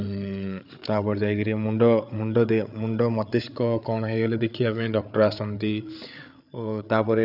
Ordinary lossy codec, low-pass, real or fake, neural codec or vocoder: none; 5.4 kHz; fake; codec, 16 kHz, 8 kbps, FreqCodec, larger model